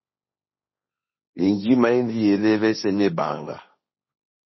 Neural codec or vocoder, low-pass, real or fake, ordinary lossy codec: codec, 16 kHz, 1.1 kbps, Voila-Tokenizer; 7.2 kHz; fake; MP3, 24 kbps